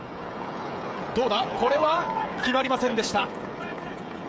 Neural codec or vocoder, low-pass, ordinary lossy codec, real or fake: codec, 16 kHz, 16 kbps, FreqCodec, smaller model; none; none; fake